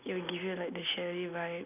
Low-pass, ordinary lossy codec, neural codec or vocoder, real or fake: 3.6 kHz; none; none; real